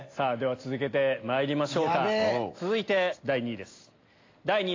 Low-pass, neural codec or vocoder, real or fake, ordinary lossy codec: 7.2 kHz; none; real; AAC, 32 kbps